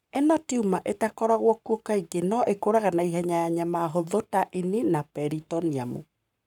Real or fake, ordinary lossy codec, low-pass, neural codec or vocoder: fake; none; 19.8 kHz; codec, 44.1 kHz, 7.8 kbps, Pupu-Codec